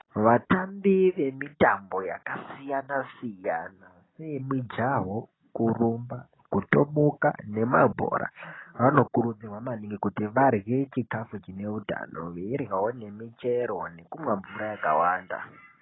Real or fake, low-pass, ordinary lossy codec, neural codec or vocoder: real; 7.2 kHz; AAC, 16 kbps; none